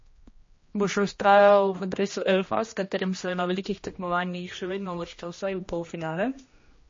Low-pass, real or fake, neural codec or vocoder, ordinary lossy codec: 7.2 kHz; fake; codec, 16 kHz, 1 kbps, X-Codec, HuBERT features, trained on general audio; MP3, 32 kbps